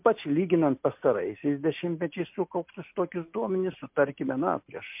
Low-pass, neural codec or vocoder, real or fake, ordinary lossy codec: 3.6 kHz; none; real; MP3, 32 kbps